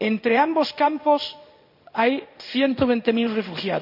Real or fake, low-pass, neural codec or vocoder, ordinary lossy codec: fake; 5.4 kHz; codec, 16 kHz in and 24 kHz out, 1 kbps, XY-Tokenizer; none